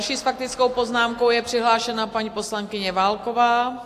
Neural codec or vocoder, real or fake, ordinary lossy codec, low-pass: none; real; AAC, 64 kbps; 14.4 kHz